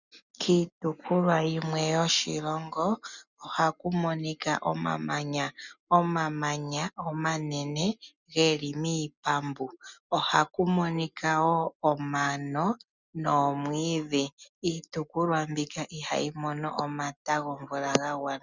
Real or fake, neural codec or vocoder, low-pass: real; none; 7.2 kHz